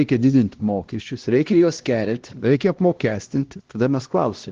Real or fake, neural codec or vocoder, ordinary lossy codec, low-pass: fake; codec, 16 kHz, 1 kbps, X-Codec, HuBERT features, trained on LibriSpeech; Opus, 16 kbps; 7.2 kHz